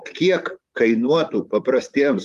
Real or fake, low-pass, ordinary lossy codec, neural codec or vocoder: fake; 14.4 kHz; Opus, 32 kbps; vocoder, 44.1 kHz, 128 mel bands, Pupu-Vocoder